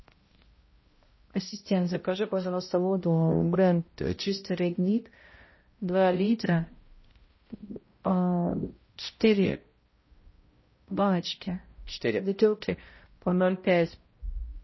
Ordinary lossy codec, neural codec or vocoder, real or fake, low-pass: MP3, 24 kbps; codec, 16 kHz, 0.5 kbps, X-Codec, HuBERT features, trained on balanced general audio; fake; 7.2 kHz